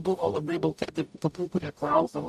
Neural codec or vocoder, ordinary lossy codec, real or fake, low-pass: codec, 44.1 kHz, 0.9 kbps, DAC; AAC, 96 kbps; fake; 14.4 kHz